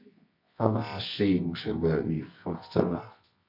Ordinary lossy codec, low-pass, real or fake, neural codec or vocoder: MP3, 32 kbps; 5.4 kHz; fake; codec, 24 kHz, 0.9 kbps, WavTokenizer, medium music audio release